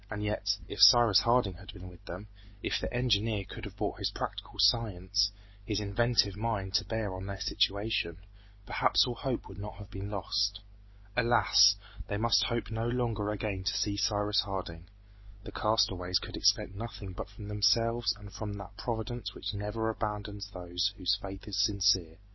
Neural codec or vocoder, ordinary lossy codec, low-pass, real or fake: none; MP3, 24 kbps; 7.2 kHz; real